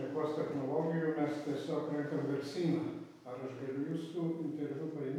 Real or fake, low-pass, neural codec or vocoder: real; 19.8 kHz; none